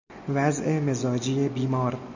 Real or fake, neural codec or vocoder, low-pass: real; none; 7.2 kHz